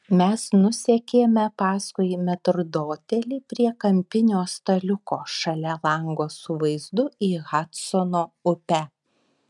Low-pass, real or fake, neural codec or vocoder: 10.8 kHz; real; none